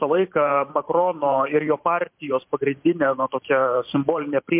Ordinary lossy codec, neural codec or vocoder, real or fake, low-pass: MP3, 32 kbps; vocoder, 44.1 kHz, 128 mel bands every 512 samples, BigVGAN v2; fake; 3.6 kHz